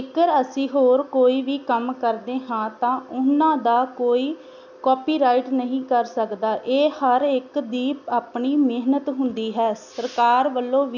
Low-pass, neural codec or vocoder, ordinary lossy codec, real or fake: 7.2 kHz; none; none; real